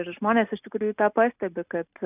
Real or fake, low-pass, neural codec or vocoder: real; 3.6 kHz; none